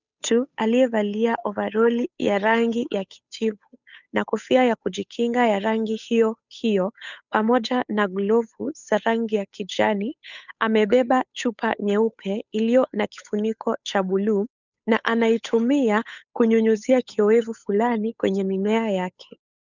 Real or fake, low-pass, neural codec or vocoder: fake; 7.2 kHz; codec, 16 kHz, 8 kbps, FunCodec, trained on Chinese and English, 25 frames a second